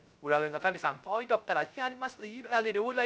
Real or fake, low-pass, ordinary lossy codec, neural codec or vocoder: fake; none; none; codec, 16 kHz, 0.3 kbps, FocalCodec